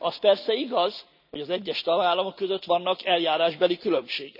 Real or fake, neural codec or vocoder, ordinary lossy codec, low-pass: real; none; none; 5.4 kHz